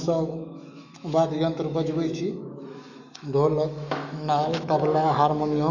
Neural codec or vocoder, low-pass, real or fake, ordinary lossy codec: autoencoder, 48 kHz, 128 numbers a frame, DAC-VAE, trained on Japanese speech; 7.2 kHz; fake; none